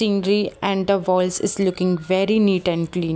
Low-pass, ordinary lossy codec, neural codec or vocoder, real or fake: none; none; none; real